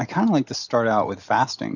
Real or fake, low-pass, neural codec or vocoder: real; 7.2 kHz; none